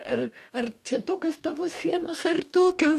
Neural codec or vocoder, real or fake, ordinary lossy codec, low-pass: codec, 44.1 kHz, 2.6 kbps, DAC; fake; MP3, 96 kbps; 14.4 kHz